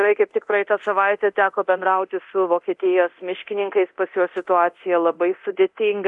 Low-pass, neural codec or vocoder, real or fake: 10.8 kHz; codec, 24 kHz, 0.9 kbps, DualCodec; fake